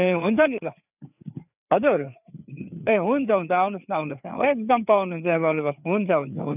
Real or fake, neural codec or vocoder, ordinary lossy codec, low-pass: fake; codec, 16 kHz, 4 kbps, FreqCodec, larger model; none; 3.6 kHz